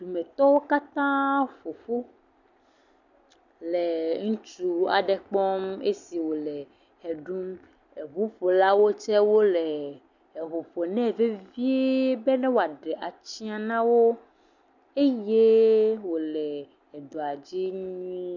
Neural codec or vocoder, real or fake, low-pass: none; real; 7.2 kHz